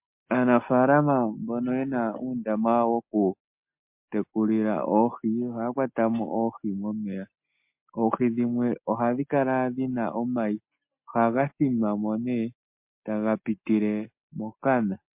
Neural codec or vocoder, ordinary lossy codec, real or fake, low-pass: none; MP3, 32 kbps; real; 3.6 kHz